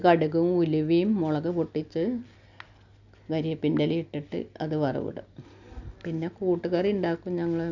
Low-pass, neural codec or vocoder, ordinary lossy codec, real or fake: 7.2 kHz; none; none; real